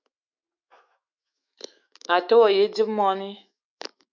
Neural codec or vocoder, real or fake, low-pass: autoencoder, 48 kHz, 128 numbers a frame, DAC-VAE, trained on Japanese speech; fake; 7.2 kHz